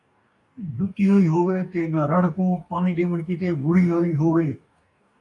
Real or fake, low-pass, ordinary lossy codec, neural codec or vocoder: fake; 10.8 kHz; AAC, 48 kbps; codec, 44.1 kHz, 2.6 kbps, DAC